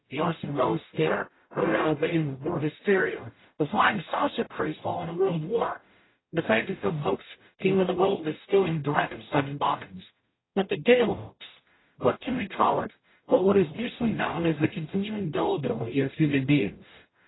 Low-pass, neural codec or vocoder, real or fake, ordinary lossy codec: 7.2 kHz; codec, 44.1 kHz, 0.9 kbps, DAC; fake; AAC, 16 kbps